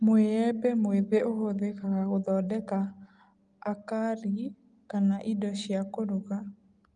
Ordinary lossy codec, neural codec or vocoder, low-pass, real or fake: Opus, 32 kbps; none; 9.9 kHz; real